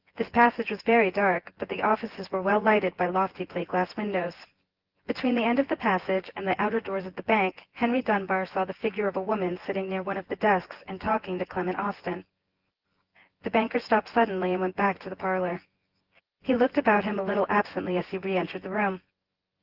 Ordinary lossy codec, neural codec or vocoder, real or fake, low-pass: Opus, 16 kbps; vocoder, 24 kHz, 100 mel bands, Vocos; fake; 5.4 kHz